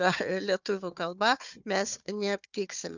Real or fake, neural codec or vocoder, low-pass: fake; codec, 16 kHz, 4 kbps, FunCodec, trained on Chinese and English, 50 frames a second; 7.2 kHz